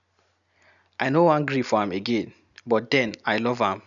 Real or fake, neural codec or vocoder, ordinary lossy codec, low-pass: real; none; none; 7.2 kHz